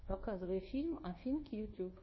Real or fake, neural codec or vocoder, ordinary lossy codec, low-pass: fake; codec, 24 kHz, 1.2 kbps, DualCodec; MP3, 24 kbps; 7.2 kHz